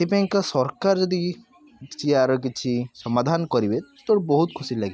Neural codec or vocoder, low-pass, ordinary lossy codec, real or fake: none; none; none; real